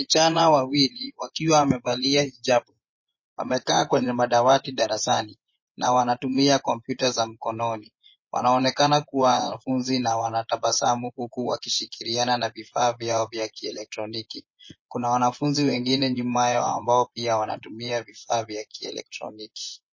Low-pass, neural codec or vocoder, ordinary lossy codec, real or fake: 7.2 kHz; vocoder, 24 kHz, 100 mel bands, Vocos; MP3, 32 kbps; fake